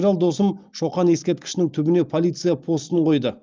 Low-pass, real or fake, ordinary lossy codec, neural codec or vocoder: 7.2 kHz; real; Opus, 32 kbps; none